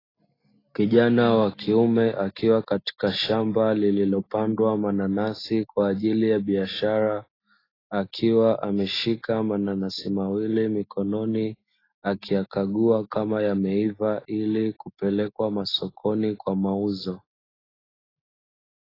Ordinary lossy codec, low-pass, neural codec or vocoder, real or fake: AAC, 24 kbps; 5.4 kHz; none; real